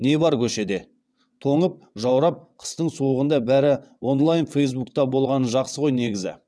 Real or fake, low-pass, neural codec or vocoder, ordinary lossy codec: fake; none; vocoder, 22.05 kHz, 80 mel bands, WaveNeXt; none